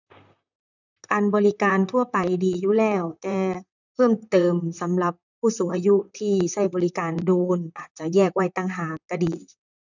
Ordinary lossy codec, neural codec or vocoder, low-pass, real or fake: none; vocoder, 44.1 kHz, 128 mel bands, Pupu-Vocoder; 7.2 kHz; fake